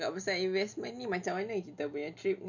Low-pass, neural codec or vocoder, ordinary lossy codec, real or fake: 7.2 kHz; none; none; real